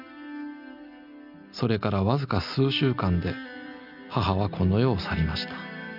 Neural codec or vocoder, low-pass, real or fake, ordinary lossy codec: none; 5.4 kHz; real; none